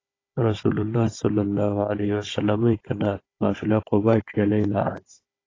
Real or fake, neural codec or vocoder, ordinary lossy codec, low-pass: fake; codec, 16 kHz, 16 kbps, FunCodec, trained on Chinese and English, 50 frames a second; AAC, 32 kbps; 7.2 kHz